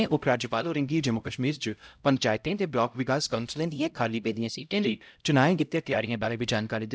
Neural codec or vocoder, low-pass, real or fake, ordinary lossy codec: codec, 16 kHz, 0.5 kbps, X-Codec, HuBERT features, trained on LibriSpeech; none; fake; none